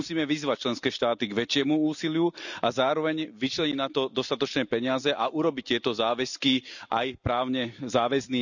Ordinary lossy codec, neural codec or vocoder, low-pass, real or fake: none; none; 7.2 kHz; real